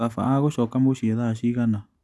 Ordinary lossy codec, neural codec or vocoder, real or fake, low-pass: none; none; real; none